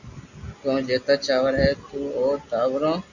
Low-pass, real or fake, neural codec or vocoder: 7.2 kHz; real; none